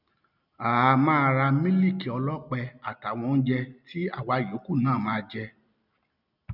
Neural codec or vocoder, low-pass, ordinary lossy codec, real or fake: none; 5.4 kHz; none; real